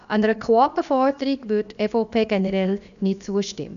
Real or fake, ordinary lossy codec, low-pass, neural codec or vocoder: fake; none; 7.2 kHz; codec, 16 kHz, about 1 kbps, DyCAST, with the encoder's durations